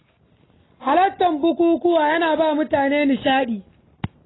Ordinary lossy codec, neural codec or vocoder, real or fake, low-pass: AAC, 16 kbps; none; real; 7.2 kHz